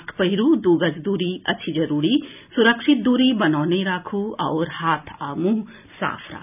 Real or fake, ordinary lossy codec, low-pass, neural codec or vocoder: real; none; 3.6 kHz; none